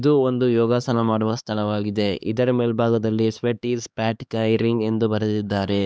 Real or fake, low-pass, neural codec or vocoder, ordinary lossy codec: fake; none; codec, 16 kHz, 2 kbps, X-Codec, HuBERT features, trained on LibriSpeech; none